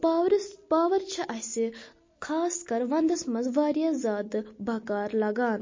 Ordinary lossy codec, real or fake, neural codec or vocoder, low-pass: MP3, 32 kbps; real; none; 7.2 kHz